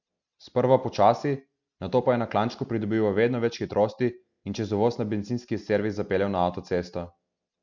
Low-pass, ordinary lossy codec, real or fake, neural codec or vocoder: 7.2 kHz; none; real; none